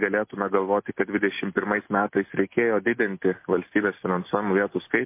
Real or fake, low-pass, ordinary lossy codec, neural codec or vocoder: real; 3.6 kHz; MP3, 24 kbps; none